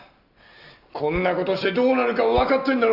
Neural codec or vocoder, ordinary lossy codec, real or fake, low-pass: none; none; real; 5.4 kHz